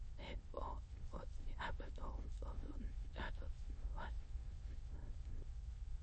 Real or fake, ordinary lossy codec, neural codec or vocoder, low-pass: fake; MP3, 32 kbps; autoencoder, 22.05 kHz, a latent of 192 numbers a frame, VITS, trained on many speakers; 9.9 kHz